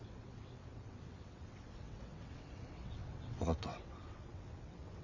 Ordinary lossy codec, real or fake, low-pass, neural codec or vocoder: Opus, 64 kbps; fake; 7.2 kHz; vocoder, 22.05 kHz, 80 mel bands, WaveNeXt